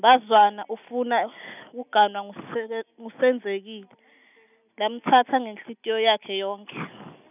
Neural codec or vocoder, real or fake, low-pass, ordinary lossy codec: none; real; 3.6 kHz; none